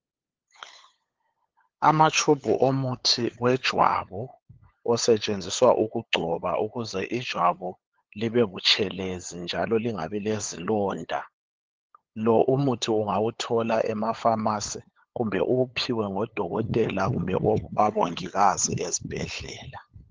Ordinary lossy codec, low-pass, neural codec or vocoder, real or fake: Opus, 16 kbps; 7.2 kHz; codec, 16 kHz, 8 kbps, FunCodec, trained on LibriTTS, 25 frames a second; fake